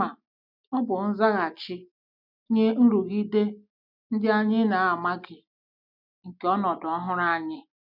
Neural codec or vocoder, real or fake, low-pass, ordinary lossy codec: none; real; 5.4 kHz; none